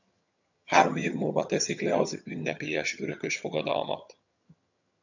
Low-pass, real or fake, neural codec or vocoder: 7.2 kHz; fake; vocoder, 22.05 kHz, 80 mel bands, HiFi-GAN